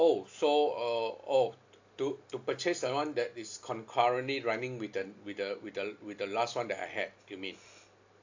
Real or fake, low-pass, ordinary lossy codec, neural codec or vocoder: real; 7.2 kHz; AAC, 48 kbps; none